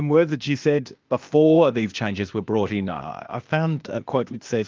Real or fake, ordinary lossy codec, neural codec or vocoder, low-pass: fake; Opus, 24 kbps; codec, 16 kHz, 0.8 kbps, ZipCodec; 7.2 kHz